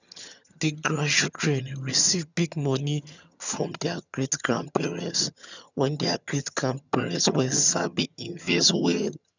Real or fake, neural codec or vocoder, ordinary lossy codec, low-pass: fake; vocoder, 22.05 kHz, 80 mel bands, HiFi-GAN; none; 7.2 kHz